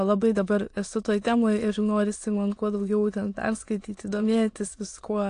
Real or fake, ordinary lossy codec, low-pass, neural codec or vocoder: fake; AAC, 48 kbps; 9.9 kHz; autoencoder, 22.05 kHz, a latent of 192 numbers a frame, VITS, trained on many speakers